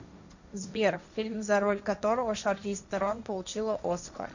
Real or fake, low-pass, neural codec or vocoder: fake; 7.2 kHz; codec, 16 kHz, 1.1 kbps, Voila-Tokenizer